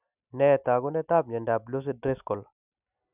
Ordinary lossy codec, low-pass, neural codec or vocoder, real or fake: none; 3.6 kHz; none; real